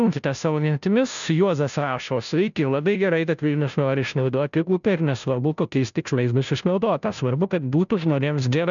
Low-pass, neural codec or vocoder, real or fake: 7.2 kHz; codec, 16 kHz, 0.5 kbps, FunCodec, trained on Chinese and English, 25 frames a second; fake